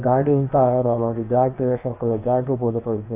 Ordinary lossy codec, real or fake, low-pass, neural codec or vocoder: AAC, 24 kbps; fake; 3.6 kHz; codec, 16 kHz, about 1 kbps, DyCAST, with the encoder's durations